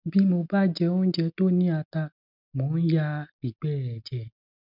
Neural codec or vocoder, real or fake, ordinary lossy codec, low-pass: none; real; none; 5.4 kHz